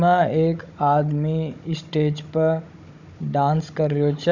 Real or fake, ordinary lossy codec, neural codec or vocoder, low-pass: fake; none; codec, 16 kHz, 16 kbps, FunCodec, trained on Chinese and English, 50 frames a second; 7.2 kHz